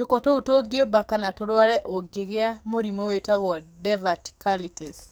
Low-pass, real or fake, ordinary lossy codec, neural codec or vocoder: none; fake; none; codec, 44.1 kHz, 2.6 kbps, SNAC